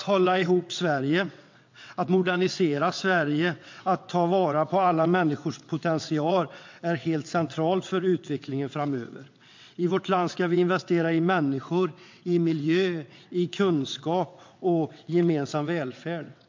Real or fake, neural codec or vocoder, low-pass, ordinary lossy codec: fake; vocoder, 22.05 kHz, 80 mel bands, WaveNeXt; 7.2 kHz; MP3, 48 kbps